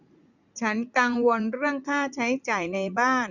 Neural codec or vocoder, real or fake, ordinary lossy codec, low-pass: vocoder, 44.1 kHz, 80 mel bands, Vocos; fake; none; 7.2 kHz